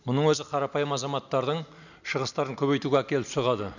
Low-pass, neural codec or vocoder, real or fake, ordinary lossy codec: 7.2 kHz; none; real; none